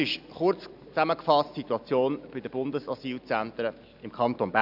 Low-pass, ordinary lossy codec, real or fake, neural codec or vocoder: 5.4 kHz; none; real; none